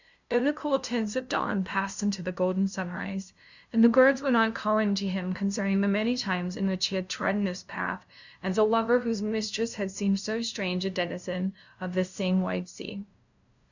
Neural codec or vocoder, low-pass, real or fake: codec, 16 kHz, 0.5 kbps, FunCodec, trained on LibriTTS, 25 frames a second; 7.2 kHz; fake